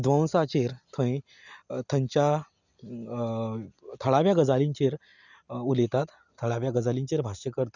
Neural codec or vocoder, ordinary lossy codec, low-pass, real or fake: none; none; 7.2 kHz; real